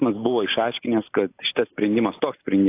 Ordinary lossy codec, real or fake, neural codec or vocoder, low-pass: AAC, 24 kbps; real; none; 3.6 kHz